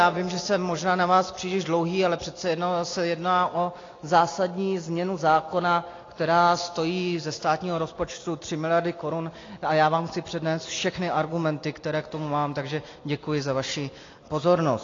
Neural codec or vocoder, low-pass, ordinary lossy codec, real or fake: none; 7.2 kHz; AAC, 32 kbps; real